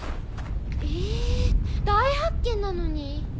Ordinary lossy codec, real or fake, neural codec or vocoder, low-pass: none; real; none; none